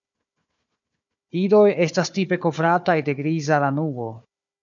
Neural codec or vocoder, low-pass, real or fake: codec, 16 kHz, 4 kbps, FunCodec, trained on Chinese and English, 50 frames a second; 7.2 kHz; fake